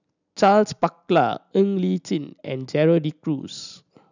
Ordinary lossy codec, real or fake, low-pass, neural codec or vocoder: none; real; 7.2 kHz; none